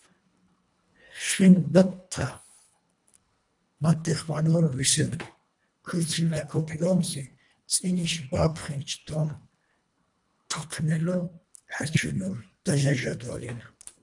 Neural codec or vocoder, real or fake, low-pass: codec, 24 kHz, 1.5 kbps, HILCodec; fake; 10.8 kHz